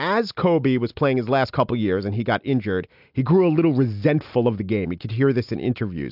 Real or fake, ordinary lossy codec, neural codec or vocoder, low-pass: real; AAC, 48 kbps; none; 5.4 kHz